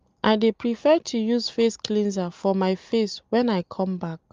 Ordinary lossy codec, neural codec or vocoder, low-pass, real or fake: Opus, 32 kbps; none; 7.2 kHz; real